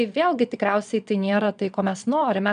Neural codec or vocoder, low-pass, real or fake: vocoder, 22.05 kHz, 80 mel bands, Vocos; 9.9 kHz; fake